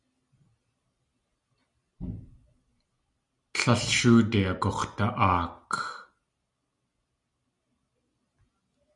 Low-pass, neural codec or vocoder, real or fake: 10.8 kHz; none; real